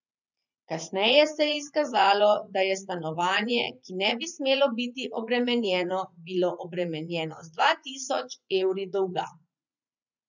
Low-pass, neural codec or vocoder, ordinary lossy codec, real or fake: 7.2 kHz; vocoder, 44.1 kHz, 80 mel bands, Vocos; none; fake